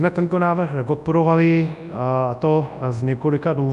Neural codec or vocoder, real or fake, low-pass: codec, 24 kHz, 0.9 kbps, WavTokenizer, large speech release; fake; 10.8 kHz